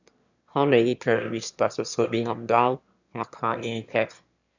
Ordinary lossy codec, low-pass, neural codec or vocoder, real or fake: none; 7.2 kHz; autoencoder, 22.05 kHz, a latent of 192 numbers a frame, VITS, trained on one speaker; fake